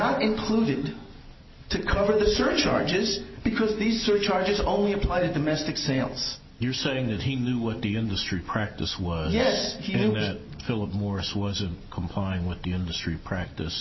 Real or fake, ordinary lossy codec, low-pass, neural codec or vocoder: real; MP3, 24 kbps; 7.2 kHz; none